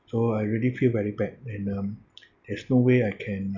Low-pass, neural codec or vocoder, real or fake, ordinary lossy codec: 7.2 kHz; none; real; none